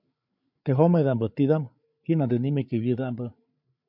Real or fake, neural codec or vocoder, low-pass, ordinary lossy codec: fake; codec, 16 kHz, 8 kbps, FreqCodec, larger model; 5.4 kHz; MP3, 48 kbps